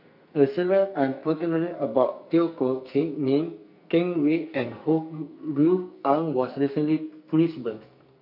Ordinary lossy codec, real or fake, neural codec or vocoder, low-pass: none; fake; codec, 44.1 kHz, 2.6 kbps, SNAC; 5.4 kHz